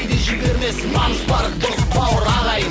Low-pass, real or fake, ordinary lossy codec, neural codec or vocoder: none; real; none; none